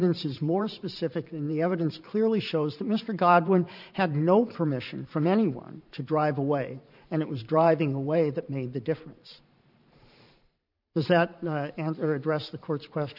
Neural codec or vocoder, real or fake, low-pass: vocoder, 44.1 kHz, 128 mel bands every 512 samples, BigVGAN v2; fake; 5.4 kHz